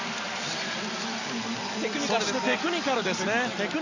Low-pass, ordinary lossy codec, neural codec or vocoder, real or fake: 7.2 kHz; Opus, 64 kbps; none; real